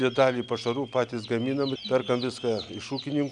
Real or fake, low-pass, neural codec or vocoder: real; 10.8 kHz; none